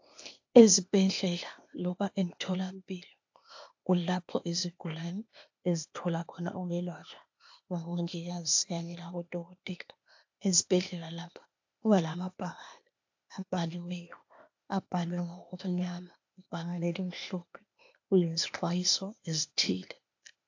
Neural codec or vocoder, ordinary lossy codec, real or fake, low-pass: codec, 16 kHz, 0.8 kbps, ZipCodec; AAC, 48 kbps; fake; 7.2 kHz